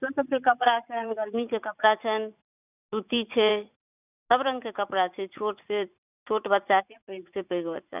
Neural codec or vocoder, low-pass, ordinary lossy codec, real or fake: vocoder, 44.1 kHz, 80 mel bands, Vocos; 3.6 kHz; none; fake